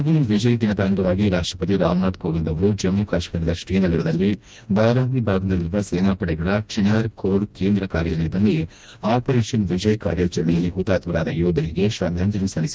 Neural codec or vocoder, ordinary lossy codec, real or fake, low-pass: codec, 16 kHz, 1 kbps, FreqCodec, smaller model; none; fake; none